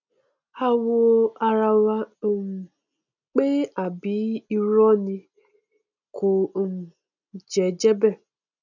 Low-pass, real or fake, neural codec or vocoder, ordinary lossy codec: 7.2 kHz; real; none; none